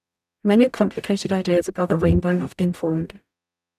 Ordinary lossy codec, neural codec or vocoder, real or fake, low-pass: none; codec, 44.1 kHz, 0.9 kbps, DAC; fake; 14.4 kHz